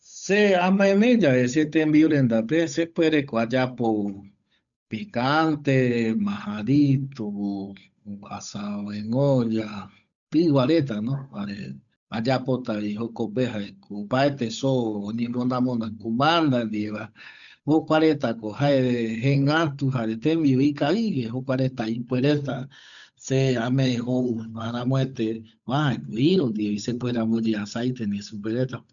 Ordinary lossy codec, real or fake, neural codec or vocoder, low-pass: none; fake; codec, 16 kHz, 8 kbps, FunCodec, trained on Chinese and English, 25 frames a second; 7.2 kHz